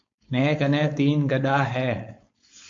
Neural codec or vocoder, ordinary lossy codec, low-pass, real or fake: codec, 16 kHz, 4.8 kbps, FACodec; MP3, 48 kbps; 7.2 kHz; fake